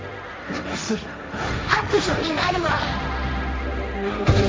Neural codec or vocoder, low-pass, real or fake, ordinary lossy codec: codec, 16 kHz, 1.1 kbps, Voila-Tokenizer; none; fake; none